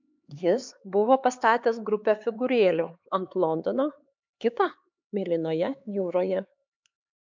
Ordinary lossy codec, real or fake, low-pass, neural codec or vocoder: MP3, 64 kbps; fake; 7.2 kHz; codec, 16 kHz, 4 kbps, X-Codec, HuBERT features, trained on LibriSpeech